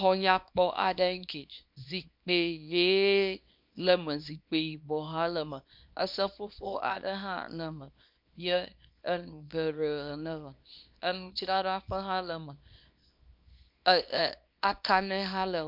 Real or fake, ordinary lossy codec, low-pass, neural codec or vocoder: fake; MP3, 48 kbps; 5.4 kHz; codec, 24 kHz, 0.9 kbps, WavTokenizer, small release